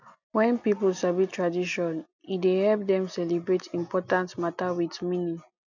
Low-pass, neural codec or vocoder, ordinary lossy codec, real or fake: 7.2 kHz; none; none; real